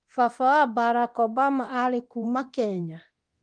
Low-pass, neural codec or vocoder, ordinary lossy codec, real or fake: 9.9 kHz; codec, 24 kHz, 0.9 kbps, DualCodec; Opus, 24 kbps; fake